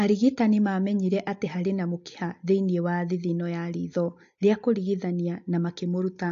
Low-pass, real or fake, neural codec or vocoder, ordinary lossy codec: 7.2 kHz; real; none; AAC, 48 kbps